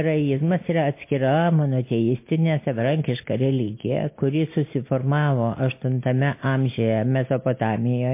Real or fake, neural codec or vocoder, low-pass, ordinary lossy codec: real; none; 3.6 kHz; MP3, 24 kbps